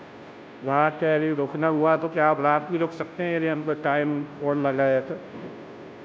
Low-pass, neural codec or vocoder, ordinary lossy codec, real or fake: none; codec, 16 kHz, 0.5 kbps, FunCodec, trained on Chinese and English, 25 frames a second; none; fake